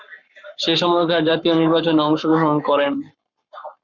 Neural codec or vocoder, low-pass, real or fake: codec, 44.1 kHz, 7.8 kbps, Pupu-Codec; 7.2 kHz; fake